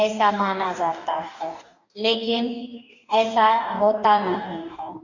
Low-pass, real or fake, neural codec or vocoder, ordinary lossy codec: 7.2 kHz; fake; codec, 44.1 kHz, 2.6 kbps, DAC; none